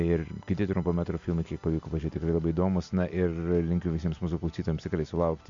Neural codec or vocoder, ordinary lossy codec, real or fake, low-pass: none; MP3, 64 kbps; real; 7.2 kHz